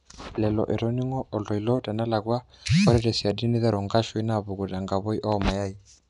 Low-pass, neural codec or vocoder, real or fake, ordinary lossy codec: 10.8 kHz; none; real; none